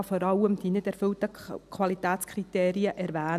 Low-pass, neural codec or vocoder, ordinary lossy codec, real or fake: 14.4 kHz; none; none; real